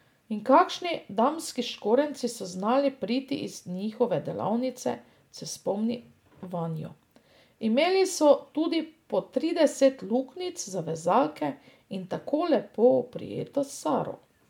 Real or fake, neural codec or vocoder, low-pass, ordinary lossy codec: real; none; 19.8 kHz; MP3, 96 kbps